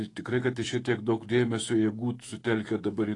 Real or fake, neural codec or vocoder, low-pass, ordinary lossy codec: fake; autoencoder, 48 kHz, 128 numbers a frame, DAC-VAE, trained on Japanese speech; 10.8 kHz; AAC, 32 kbps